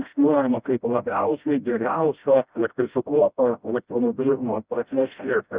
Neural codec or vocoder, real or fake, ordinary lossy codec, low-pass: codec, 16 kHz, 0.5 kbps, FreqCodec, smaller model; fake; Opus, 64 kbps; 3.6 kHz